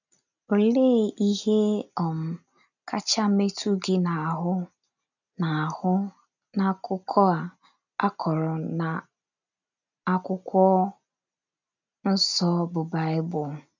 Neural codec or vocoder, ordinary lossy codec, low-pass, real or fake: none; none; 7.2 kHz; real